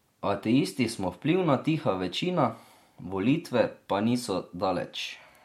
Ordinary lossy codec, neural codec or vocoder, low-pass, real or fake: MP3, 64 kbps; none; 19.8 kHz; real